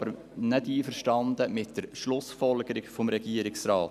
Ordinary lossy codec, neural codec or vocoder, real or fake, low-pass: none; none; real; 14.4 kHz